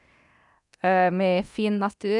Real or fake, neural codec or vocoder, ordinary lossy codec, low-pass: fake; codec, 24 kHz, 0.9 kbps, DualCodec; none; 10.8 kHz